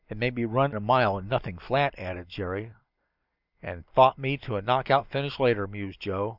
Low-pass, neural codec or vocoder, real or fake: 5.4 kHz; none; real